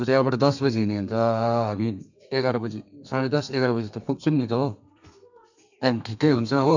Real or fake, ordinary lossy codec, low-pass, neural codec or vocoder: fake; none; 7.2 kHz; codec, 32 kHz, 1.9 kbps, SNAC